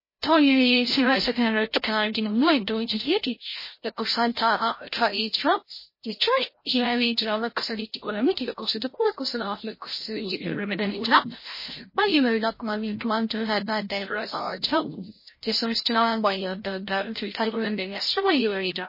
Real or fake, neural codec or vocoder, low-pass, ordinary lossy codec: fake; codec, 16 kHz, 0.5 kbps, FreqCodec, larger model; 5.4 kHz; MP3, 24 kbps